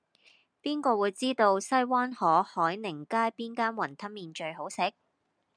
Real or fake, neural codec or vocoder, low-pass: real; none; 9.9 kHz